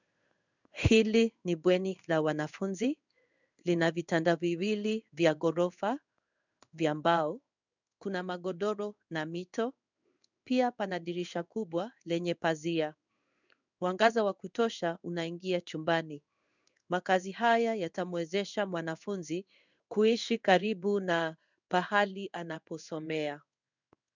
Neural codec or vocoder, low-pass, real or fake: codec, 16 kHz in and 24 kHz out, 1 kbps, XY-Tokenizer; 7.2 kHz; fake